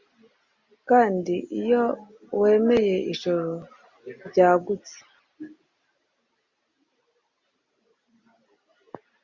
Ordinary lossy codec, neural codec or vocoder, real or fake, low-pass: Opus, 64 kbps; none; real; 7.2 kHz